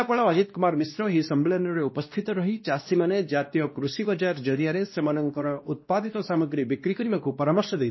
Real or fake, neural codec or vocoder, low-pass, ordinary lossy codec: fake; codec, 16 kHz, 1 kbps, X-Codec, WavLM features, trained on Multilingual LibriSpeech; 7.2 kHz; MP3, 24 kbps